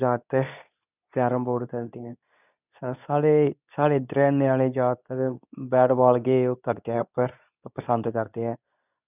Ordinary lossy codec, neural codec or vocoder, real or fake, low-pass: none; codec, 24 kHz, 0.9 kbps, WavTokenizer, medium speech release version 2; fake; 3.6 kHz